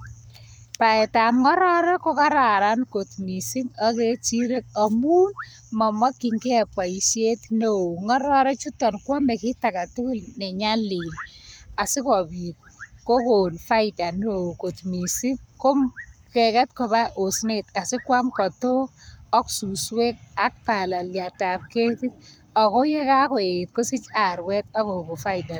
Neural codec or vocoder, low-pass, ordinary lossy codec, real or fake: codec, 44.1 kHz, 7.8 kbps, Pupu-Codec; none; none; fake